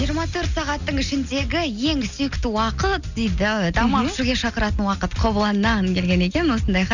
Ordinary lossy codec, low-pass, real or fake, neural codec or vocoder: none; 7.2 kHz; real; none